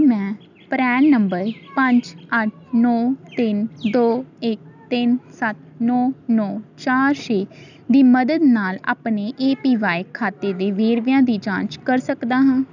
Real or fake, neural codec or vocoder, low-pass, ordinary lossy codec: real; none; 7.2 kHz; none